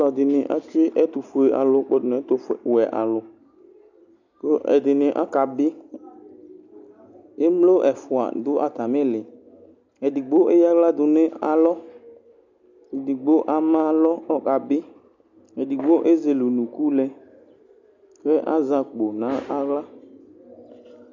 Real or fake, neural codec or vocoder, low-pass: real; none; 7.2 kHz